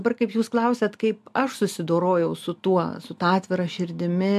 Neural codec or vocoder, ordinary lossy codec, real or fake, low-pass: none; AAC, 96 kbps; real; 14.4 kHz